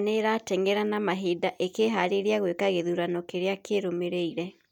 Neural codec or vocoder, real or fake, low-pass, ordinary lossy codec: none; real; 19.8 kHz; none